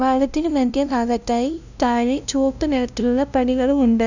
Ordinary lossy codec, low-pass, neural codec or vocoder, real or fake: none; 7.2 kHz; codec, 16 kHz, 0.5 kbps, FunCodec, trained on LibriTTS, 25 frames a second; fake